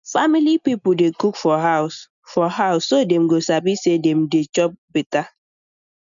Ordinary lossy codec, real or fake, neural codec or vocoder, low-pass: none; real; none; 7.2 kHz